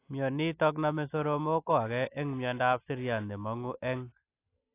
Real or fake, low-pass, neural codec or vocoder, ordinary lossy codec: real; 3.6 kHz; none; AAC, 24 kbps